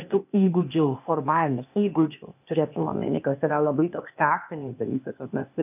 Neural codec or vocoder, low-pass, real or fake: codec, 16 kHz, 0.8 kbps, ZipCodec; 3.6 kHz; fake